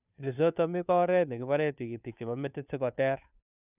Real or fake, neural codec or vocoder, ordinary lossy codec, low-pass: fake; codec, 16 kHz, 2 kbps, FunCodec, trained on Chinese and English, 25 frames a second; none; 3.6 kHz